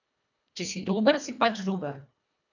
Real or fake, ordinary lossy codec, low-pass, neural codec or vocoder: fake; none; 7.2 kHz; codec, 24 kHz, 1.5 kbps, HILCodec